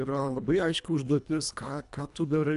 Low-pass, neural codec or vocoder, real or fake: 10.8 kHz; codec, 24 kHz, 1.5 kbps, HILCodec; fake